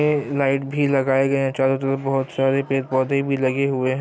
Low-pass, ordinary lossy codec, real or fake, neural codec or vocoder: none; none; real; none